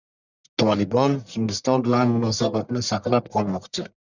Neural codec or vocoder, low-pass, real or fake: codec, 44.1 kHz, 1.7 kbps, Pupu-Codec; 7.2 kHz; fake